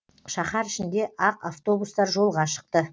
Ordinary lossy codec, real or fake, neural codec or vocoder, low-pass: none; real; none; none